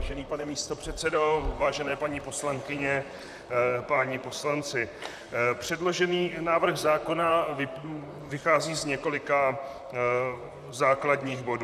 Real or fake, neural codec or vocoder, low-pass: fake; vocoder, 44.1 kHz, 128 mel bands, Pupu-Vocoder; 14.4 kHz